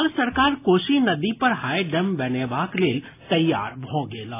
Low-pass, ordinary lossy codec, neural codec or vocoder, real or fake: 3.6 kHz; AAC, 24 kbps; none; real